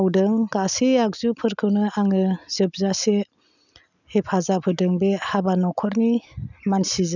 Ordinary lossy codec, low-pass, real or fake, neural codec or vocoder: none; 7.2 kHz; real; none